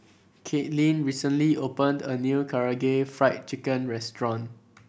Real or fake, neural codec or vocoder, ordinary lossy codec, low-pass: real; none; none; none